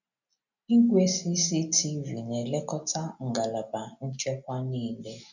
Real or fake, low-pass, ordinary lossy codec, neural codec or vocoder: real; 7.2 kHz; none; none